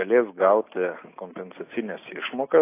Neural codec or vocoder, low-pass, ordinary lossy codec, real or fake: none; 3.6 kHz; AAC, 24 kbps; real